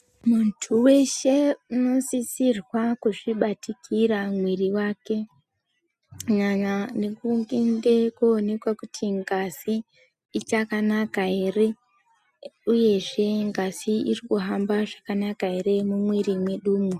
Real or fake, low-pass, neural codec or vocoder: real; 14.4 kHz; none